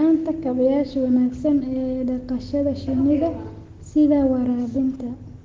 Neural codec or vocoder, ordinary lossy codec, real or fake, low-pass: none; Opus, 16 kbps; real; 7.2 kHz